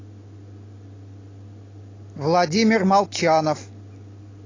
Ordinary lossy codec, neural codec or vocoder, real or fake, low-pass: AAC, 32 kbps; none; real; 7.2 kHz